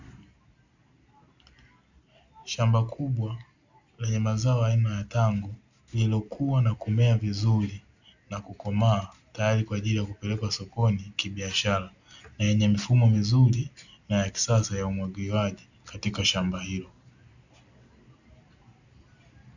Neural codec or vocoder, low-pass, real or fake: none; 7.2 kHz; real